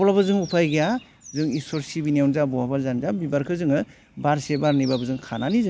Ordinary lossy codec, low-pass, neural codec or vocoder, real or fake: none; none; none; real